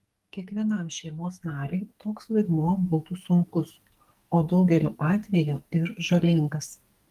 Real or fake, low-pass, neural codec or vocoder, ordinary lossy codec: fake; 14.4 kHz; codec, 44.1 kHz, 2.6 kbps, SNAC; Opus, 32 kbps